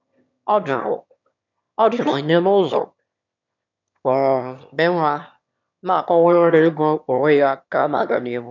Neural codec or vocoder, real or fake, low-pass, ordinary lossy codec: autoencoder, 22.05 kHz, a latent of 192 numbers a frame, VITS, trained on one speaker; fake; 7.2 kHz; none